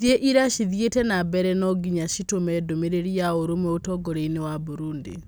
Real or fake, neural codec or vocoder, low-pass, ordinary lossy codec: real; none; none; none